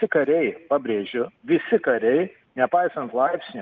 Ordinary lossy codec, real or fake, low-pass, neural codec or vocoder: Opus, 32 kbps; real; 7.2 kHz; none